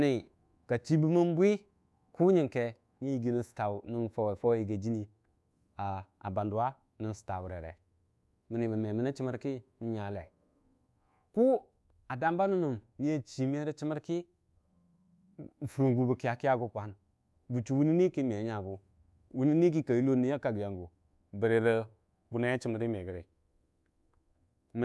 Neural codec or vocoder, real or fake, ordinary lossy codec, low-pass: codec, 24 kHz, 1.2 kbps, DualCodec; fake; none; none